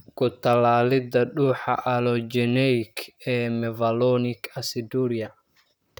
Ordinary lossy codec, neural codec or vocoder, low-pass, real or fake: none; vocoder, 44.1 kHz, 128 mel bands, Pupu-Vocoder; none; fake